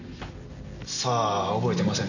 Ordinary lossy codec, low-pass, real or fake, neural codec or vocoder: none; 7.2 kHz; real; none